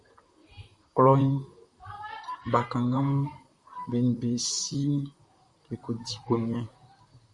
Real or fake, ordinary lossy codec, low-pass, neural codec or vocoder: fake; Opus, 64 kbps; 10.8 kHz; vocoder, 44.1 kHz, 128 mel bands, Pupu-Vocoder